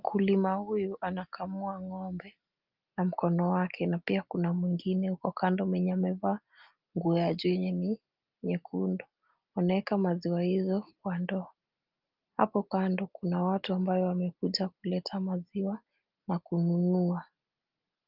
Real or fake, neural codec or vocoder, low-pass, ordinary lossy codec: real; none; 5.4 kHz; Opus, 24 kbps